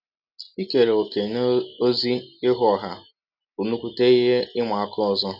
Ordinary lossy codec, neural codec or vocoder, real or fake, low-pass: none; none; real; 5.4 kHz